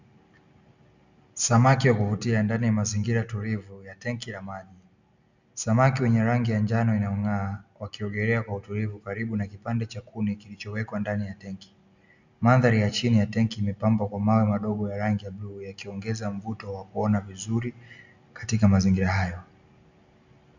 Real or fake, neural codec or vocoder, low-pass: real; none; 7.2 kHz